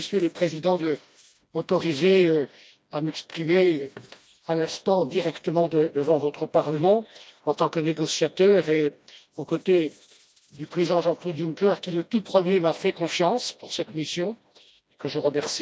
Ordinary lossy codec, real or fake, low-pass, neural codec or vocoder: none; fake; none; codec, 16 kHz, 1 kbps, FreqCodec, smaller model